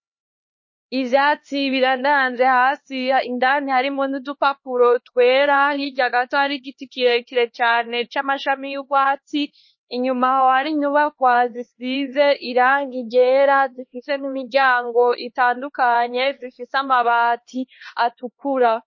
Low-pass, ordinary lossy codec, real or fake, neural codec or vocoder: 7.2 kHz; MP3, 32 kbps; fake; codec, 16 kHz, 2 kbps, X-Codec, HuBERT features, trained on LibriSpeech